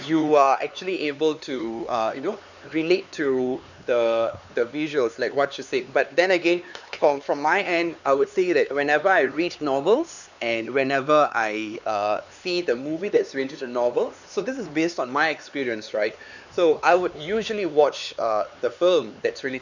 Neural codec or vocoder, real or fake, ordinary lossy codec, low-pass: codec, 16 kHz, 4 kbps, X-Codec, HuBERT features, trained on LibriSpeech; fake; none; 7.2 kHz